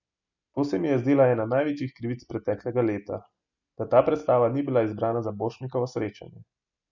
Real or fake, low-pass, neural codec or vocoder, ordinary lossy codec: real; 7.2 kHz; none; none